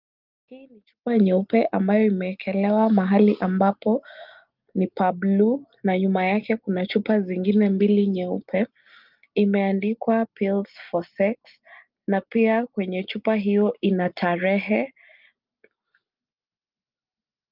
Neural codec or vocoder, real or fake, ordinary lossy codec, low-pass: none; real; Opus, 24 kbps; 5.4 kHz